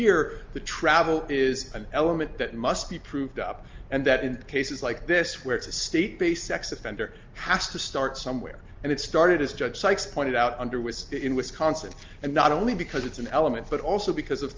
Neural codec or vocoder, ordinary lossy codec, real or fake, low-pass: none; Opus, 32 kbps; real; 7.2 kHz